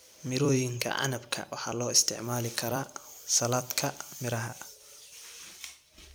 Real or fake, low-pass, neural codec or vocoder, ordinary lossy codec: fake; none; vocoder, 44.1 kHz, 128 mel bands every 256 samples, BigVGAN v2; none